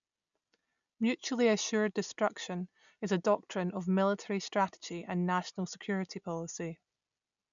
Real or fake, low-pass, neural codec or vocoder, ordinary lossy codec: real; 7.2 kHz; none; none